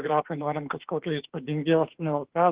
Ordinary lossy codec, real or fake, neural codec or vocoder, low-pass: Opus, 16 kbps; fake; autoencoder, 48 kHz, 32 numbers a frame, DAC-VAE, trained on Japanese speech; 3.6 kHz